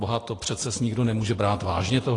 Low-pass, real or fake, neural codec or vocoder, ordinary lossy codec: 10.8 kHz; real; none; AAC, 32 kbps